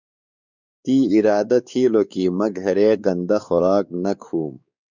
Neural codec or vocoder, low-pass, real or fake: codec, 16 kHz, 4 kbps, X-Codec, WavLM features, trained on Multilingual LibriSpeech; 7.2 kHz; fake